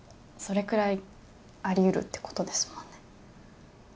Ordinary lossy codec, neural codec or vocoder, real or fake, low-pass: none; none; real; none